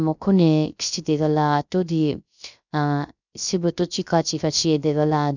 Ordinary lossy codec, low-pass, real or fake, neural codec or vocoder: none; 7.2 kHz; fake; codec, 16 kHz, 0.3 kbps, FocalCodec